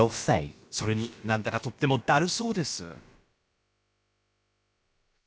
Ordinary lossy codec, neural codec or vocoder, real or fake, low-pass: none; codec, 16 kHz, about 1 kbps, DyCAST, with the encoder's durations; fake; none